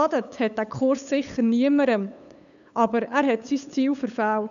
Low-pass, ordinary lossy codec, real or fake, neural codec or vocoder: 7.2 kHz; none; fake; codec, 16 kHz, 8 kbps, FunCodec, trained on LibriTTS, 25 frames a second